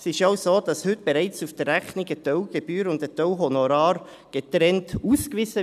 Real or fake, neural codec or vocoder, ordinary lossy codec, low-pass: real; none; none; 14.4 kHz